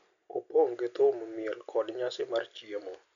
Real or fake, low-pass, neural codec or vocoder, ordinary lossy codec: real; 7.2 kHz; none; MP3, 96 kbps